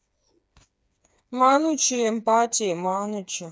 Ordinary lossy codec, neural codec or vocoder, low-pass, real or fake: none; codec, 16 kHz, 4 kbps, FreqCodec, smaller model; none; fake